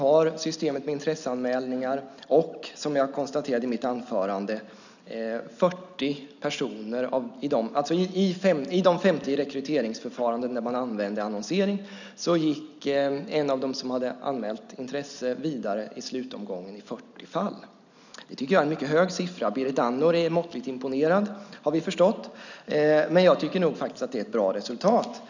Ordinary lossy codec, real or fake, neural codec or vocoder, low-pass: none; real; none; 7.2 kHz